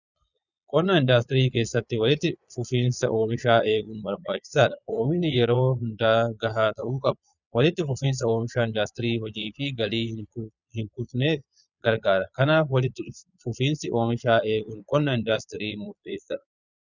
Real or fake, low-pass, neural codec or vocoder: fake; 7.2 kHz; vocoder, 22.05 kHz, 80 mel bands, Vocos